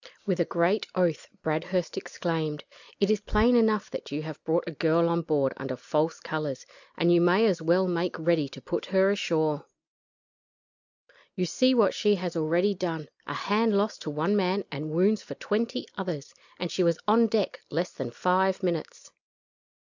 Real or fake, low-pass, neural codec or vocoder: real; 7.2 kHz; none